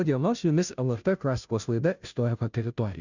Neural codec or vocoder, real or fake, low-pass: codec, 16 kHz, 0.5 kbps, FunCodec, trained on Chinese and English, 25 frames a second; fake; 7.2 kHz